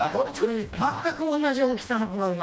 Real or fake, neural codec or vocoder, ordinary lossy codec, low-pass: fake; codec, 16 kHz, 1 kbps, FreqCodec, smaller model; none; none